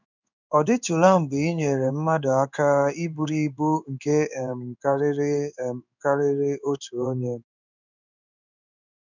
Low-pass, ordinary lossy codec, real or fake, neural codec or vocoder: 7.2 kHz; none; fake; codec, 16 kHz in and 24 kHz out, 1 kbps, XY-Tokenizer